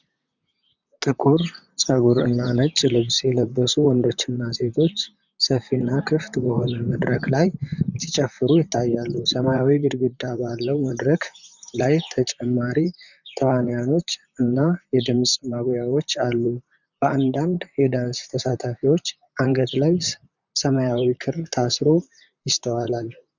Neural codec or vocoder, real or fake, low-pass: vocoder, 44.1 kHz, 128 mel bands, Pupu-Vocoder; fake; 7.2 kHz